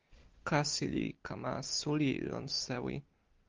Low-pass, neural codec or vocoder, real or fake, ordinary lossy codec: 7.2 kHz; none; real; Opus, 24 kbps